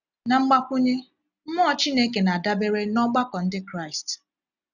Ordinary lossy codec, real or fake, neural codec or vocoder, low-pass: none; real; none; 7.2 kHz